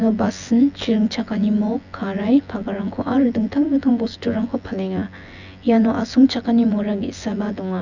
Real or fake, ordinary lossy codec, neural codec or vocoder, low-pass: fake; none; vocoder, 24 kHz, 100 mel bands, Vocos; 7.2 kHz